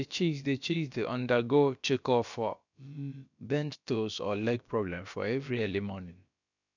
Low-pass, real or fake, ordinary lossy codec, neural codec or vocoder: 7.2 kHz; fake; none; codec, 16 kHz, about 1 kbps, DyCAST, with the encoder's durations